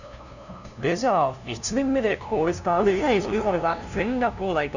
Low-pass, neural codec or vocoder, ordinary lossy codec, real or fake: 7.2 kHz; codec, 16 kHz, 0.5 kbps, FunCodec, trained on LibriTTS, 25 frames a second; none; fake